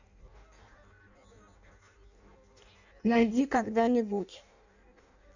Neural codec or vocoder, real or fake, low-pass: codec, 16 kHz in and 24 kHz out, 0.6 kbps, FireRedTTS-2 codec; fake; 7.2 kHz